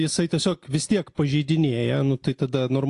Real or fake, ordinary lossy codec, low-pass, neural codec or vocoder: real; AAC, 48 kbps; 10.8 kHz; none